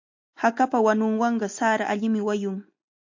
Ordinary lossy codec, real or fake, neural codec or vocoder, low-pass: MP3, 48 kbps; real; none; 7.2 kHz